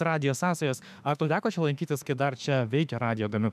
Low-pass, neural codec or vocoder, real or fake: 14.4 kHz; autoencoder, 48 kHz, 32 numbers a frame, DAC-VAE, trained on Japanese speech; fake